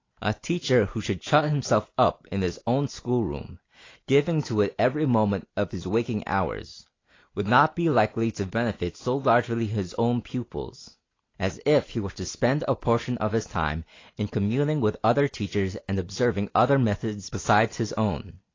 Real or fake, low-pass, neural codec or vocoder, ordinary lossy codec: real; 7.2 kHz; none; AAC, 32 kbps